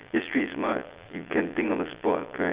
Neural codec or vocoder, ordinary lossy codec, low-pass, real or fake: vocoder, 22.05 kHz, 80 mel bands, Vocos; Opus, 32 kbps; 3.6 kHz; fake